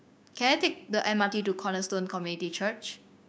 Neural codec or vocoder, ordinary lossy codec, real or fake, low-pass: codec, 16 kHz, 6 kbps, DAC; none; fake; none